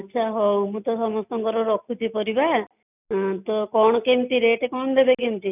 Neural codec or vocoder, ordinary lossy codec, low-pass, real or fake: none; none; 3.6 kHz; real